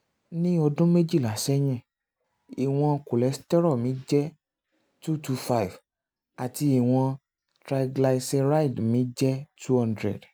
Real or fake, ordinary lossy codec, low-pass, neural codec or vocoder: real; none; none; none